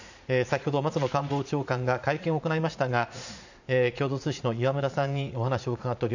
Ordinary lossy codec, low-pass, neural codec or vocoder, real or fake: none; 7.2 kHz; vocoder, 44.1 kHz, 80 mel bands, Vocos; fake